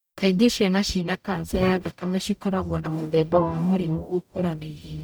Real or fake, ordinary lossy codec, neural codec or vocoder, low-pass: fake; none; codec, 44.1 kHz, 0.9 kbps, DAC; none